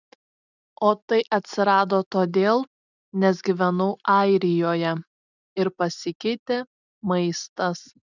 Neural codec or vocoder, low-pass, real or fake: none; 7.2 kHz; real